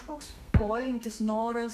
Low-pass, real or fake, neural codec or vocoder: 14.4 kHz; fake; codec, 32 kHz, 1.9 kbps, SNAC